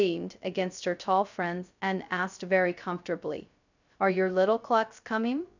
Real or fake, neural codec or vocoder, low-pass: fake; codec, 16 kHz, 0.2 kbps, FocalCodec; 7.2 kHz